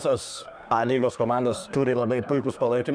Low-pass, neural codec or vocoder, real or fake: 9.9 kHz; codec, 24 kHz, 1 kbps, SNAC; fake